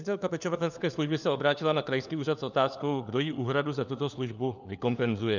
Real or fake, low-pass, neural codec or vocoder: fake; 7.2 kHz; codec, 16 kHz, 4 kbps, FunCodec, trained on LibriTTS, 50 frames a second